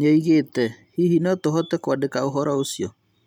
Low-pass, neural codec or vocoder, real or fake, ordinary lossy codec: 19.8 kHz; none; real; none